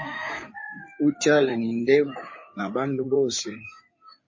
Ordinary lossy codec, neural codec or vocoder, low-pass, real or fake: MP3, 32 kbps; codec, 16 kHz in and 24 kHz out, 2.2 kbps, FireRedTTS-2 codec; 7.2 kHz; fake